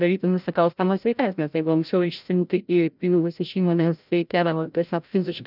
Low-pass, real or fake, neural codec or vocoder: 5.4 kHz; fake; codec, 16 kHz, 0.5 kbps, FreqCodec, larger model